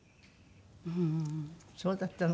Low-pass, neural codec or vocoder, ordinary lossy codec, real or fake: none; none; none; real